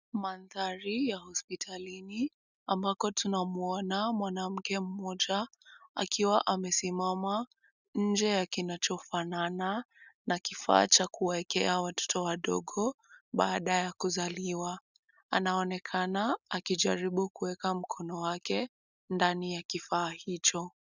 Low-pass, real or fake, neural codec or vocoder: 7.2 kHz; real; none